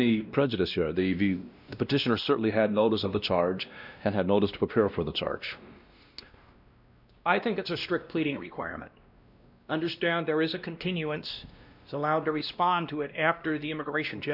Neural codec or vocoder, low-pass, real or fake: codec, 16 kHz, 1 kbps, X-Codec, WavLM features, trained on Multilingual LibriSpeech; 5.4 kHz; fake